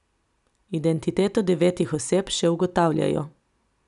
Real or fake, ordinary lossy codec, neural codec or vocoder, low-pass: real; none; none; 10.8 kHz